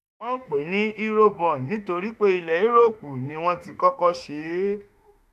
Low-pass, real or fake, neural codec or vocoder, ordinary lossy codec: 14.4 kHz; fake; autoencoder, 48 kHz, 32 numbers a frame, DAC-VAE, trained on Japanese speech; none